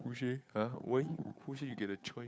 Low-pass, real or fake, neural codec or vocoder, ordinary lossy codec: none; fake; codec, 16 kHz, 8 kbps, FunCodec, trained on Chinese and English, 25 frames a second; none